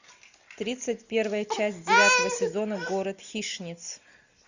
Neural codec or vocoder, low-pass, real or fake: none; 7.2 kHz; real